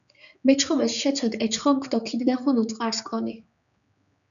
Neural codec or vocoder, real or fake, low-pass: codec, 16 kHz, 4 kbps, X-Codec, HuBERT features, trained on general audio; fake; 7.2 kHz